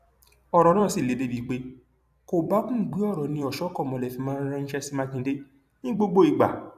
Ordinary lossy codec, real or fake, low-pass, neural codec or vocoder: AAC, 96 kbps; fake; 14.4 kHz; vocoder, 44.1 kHz, 128 mel bands every 256 samples, BigVGAN v2